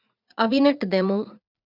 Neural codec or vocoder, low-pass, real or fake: vocoder, 44.1 kHz, 80 mel bands, Vocos; 5.4 kHz; fake